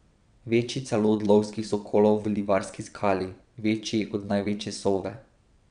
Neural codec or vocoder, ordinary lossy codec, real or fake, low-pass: vocoder, 22.05 kHz, 80 mel bands, WaveNeXt; none; fake; 9.9 kHz